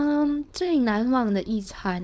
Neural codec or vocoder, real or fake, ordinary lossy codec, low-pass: codec, 16 kHz, 4.8 kbps, FACodec; fake; none; none